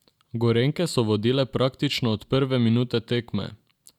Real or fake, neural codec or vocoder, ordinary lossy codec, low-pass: real; none; none; 19.8 kHz